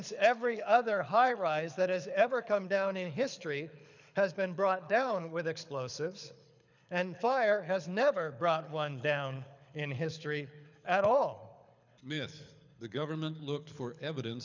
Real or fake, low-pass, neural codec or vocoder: fake; 7.2 kHz; codec, 24 kHz, 6 kbps, HILCodec